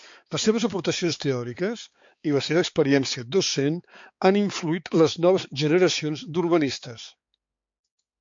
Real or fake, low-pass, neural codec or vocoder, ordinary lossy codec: fake; 7.2 kHz; codec, 16 kHz, 4 kbps, X-Codec, HuBERT features, trained on balanced general audio; MP3, 48 kbps